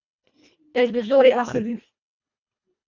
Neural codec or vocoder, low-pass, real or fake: codec, 24 kHz, 1.5 kbps, HILCodec; 7.2 kHz; fake